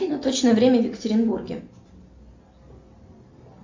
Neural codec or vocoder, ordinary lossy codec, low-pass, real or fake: none; AAC, 48 kbps; 7.2 kHz; real